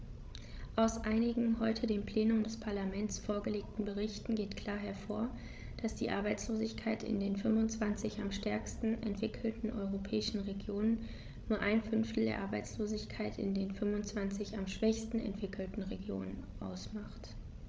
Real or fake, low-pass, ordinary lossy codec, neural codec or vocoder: fake; none; none; codec, 16 kHz, 16 kbps, FreqCodec, larger model